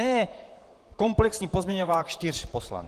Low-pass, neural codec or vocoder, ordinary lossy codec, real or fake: 14.4 kHz; vocoder, 44.1 kHz, 128 mel bands, Pupu-Vocoder; Opus, 24 kbps; fake